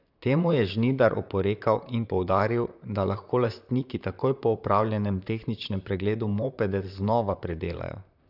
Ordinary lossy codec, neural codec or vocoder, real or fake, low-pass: none; vocoder, 44.1 kHz, 128 mel bands, Pupu-Vocoder; fake; 5.4 kHz